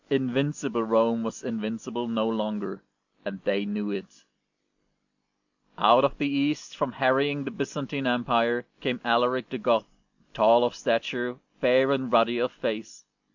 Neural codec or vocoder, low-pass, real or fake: none; 7.2 kHz; real